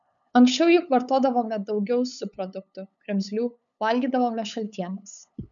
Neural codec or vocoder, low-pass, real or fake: codec, 16 kHz, 8 kbps, FunCodec, trained on LibriTTS, 25 frames a second; 7.2 kHz; fake